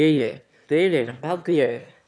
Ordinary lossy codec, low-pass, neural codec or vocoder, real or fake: none; none; autoencoder, 22.05 kHz, a latent of 192 numbers a frame, VITS, trained on one speaker; fake